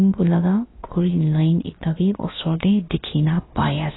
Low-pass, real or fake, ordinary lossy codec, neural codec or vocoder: 7.2 kHz; fake; AAC, 16 kbps; codec, 16 kHz, about 1 kbps, DyCAST, with the encoder's durations